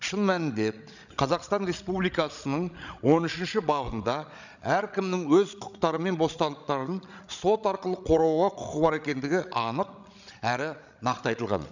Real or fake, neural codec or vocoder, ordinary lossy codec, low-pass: fake; codec, 16 kHz, 16 kbps, FreqCodec, larger model; none; 7.2 kHz